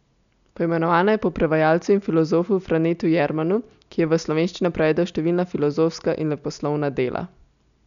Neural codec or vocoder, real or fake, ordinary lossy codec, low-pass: none; real; none; 7.2 kHz